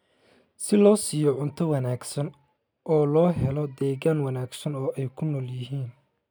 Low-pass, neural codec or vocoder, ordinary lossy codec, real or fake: none; none; none; real